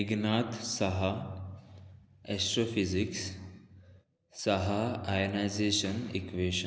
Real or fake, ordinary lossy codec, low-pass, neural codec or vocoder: real; none; none; none